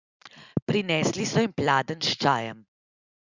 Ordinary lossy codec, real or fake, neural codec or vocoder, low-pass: Opus, 64 kbps; real; none; 7.2 kHz